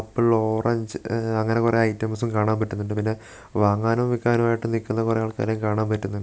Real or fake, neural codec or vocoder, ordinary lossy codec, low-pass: real; none; none; none